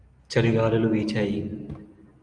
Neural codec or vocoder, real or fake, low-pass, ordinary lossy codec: none; real; 9.9 kHz; Opus, 32 kbps